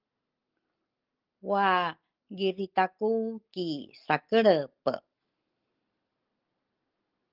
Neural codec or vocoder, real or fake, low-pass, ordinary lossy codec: none; real; 5.4 kHz; Opus, 32 kbps